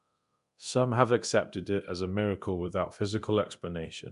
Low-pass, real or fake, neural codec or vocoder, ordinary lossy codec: 10.8 kHz; fake; codec, 24 kHz, 0.9 kbps, DualCodec; none